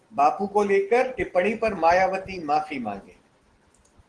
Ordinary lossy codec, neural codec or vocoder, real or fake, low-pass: Opus, 16 kbps; none; real; 9.9 kHz